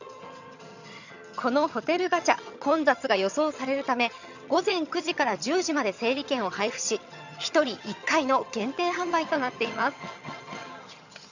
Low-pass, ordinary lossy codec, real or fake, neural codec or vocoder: 7.2 kHz; none; fake; vocoder, 22.05 kHz, 80 mel bands, HiFi-GAN